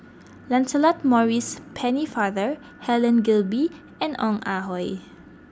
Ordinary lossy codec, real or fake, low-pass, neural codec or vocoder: none; real; none; none